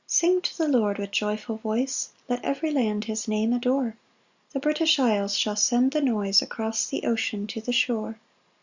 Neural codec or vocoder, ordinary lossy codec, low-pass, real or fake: none; Opus, 64 kbps; 7.2 kHz; real